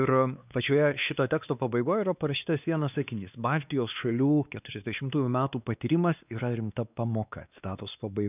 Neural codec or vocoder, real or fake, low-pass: codec, 16 kHz, 2 kbps, X-Codec, WavLM features, trained on Multilingual LibriSpeech; fake; 3.6 kHz